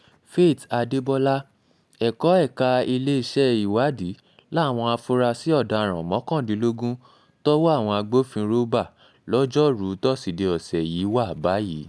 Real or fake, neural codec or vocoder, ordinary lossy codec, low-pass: real; none; none; none